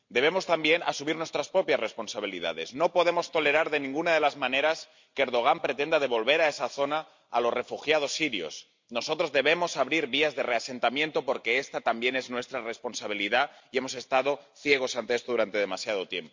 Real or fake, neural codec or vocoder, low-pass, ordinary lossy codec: real; none; 7.2 kHz; MP3, 64 kbps